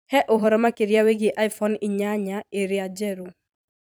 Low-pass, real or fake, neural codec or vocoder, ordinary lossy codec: none; fake; vocoder, 44.1 kHz, 128 mel bands every 256 samples, BigVGAN v2; none